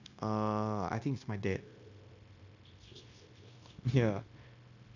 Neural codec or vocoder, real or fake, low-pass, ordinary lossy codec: codec, 16 kHz, 0.9 kbps, LongCat-Audio-Codec; fake; 7.2 kHz; none